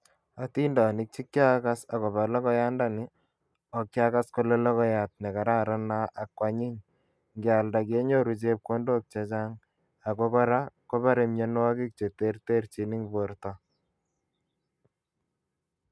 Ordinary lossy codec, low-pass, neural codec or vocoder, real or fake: none; none; none; real